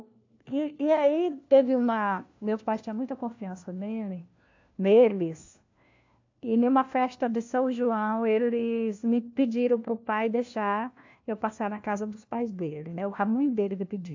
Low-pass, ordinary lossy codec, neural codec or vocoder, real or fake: 7.2 kHz; AAC, 48 kbps; codec, 16 kHz, 1 kbps, FunCodec, trained on LibriTTS, 50 frames a second; fake